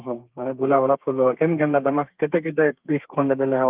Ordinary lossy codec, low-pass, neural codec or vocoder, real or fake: Opus, 32 kbps; 3.6 kHz; codec, 32 kHz, 1.9 kbps, SNAC; fake